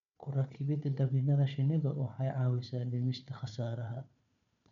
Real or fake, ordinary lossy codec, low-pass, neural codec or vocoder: fake; none; 7.2 kHz; codec, 16 kHz, 4 kbps, FunCodec, trained on Chinese and English, 50 frames a second